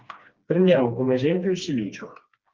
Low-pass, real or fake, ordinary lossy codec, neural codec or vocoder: 7.2 kHz; fake; Opus, 32 kbps; codec, 16 kHz, 2 kbps, FreqCodec, smaller model